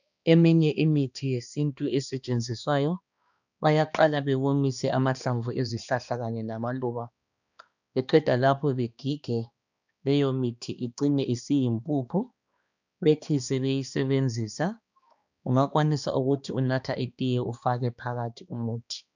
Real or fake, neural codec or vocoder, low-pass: fake; codec, 16 kHz, 2 kbps, X-Codec, HuBERT features, trained on balanced general audio; 7.2 kHz